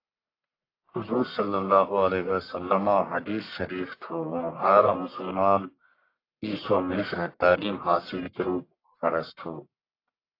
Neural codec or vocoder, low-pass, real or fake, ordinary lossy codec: codec, 44.1 kHz, 1.7 kbps, Pupu-Codec; 5.4 kHz; fake; AAC, 32 kbps